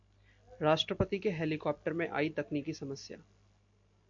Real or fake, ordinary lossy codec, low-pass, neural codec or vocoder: real; AAC, 64 kbps; 7.2 kHz; none